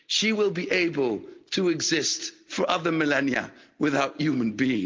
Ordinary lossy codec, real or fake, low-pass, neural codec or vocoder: Opus, 32 kbps; real; 7.2 kHz; none